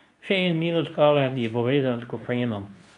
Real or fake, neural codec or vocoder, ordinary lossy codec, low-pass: fake; codec, 24 kHz, 0.9 kbps, WavTokenizer, medium speech release version 2; none; 10.8 kHz